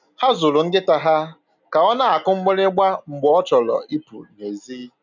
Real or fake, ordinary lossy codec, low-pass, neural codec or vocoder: real; none; 7.2 kHz; none